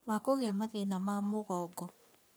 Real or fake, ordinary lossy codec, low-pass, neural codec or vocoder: fake; none; none; codec, 44.1 kHz, 3.4 kbps, Pupu-Codec